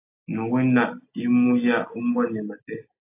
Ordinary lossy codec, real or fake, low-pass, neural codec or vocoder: AAC, 24 kbps; real; 3.6 kHz; none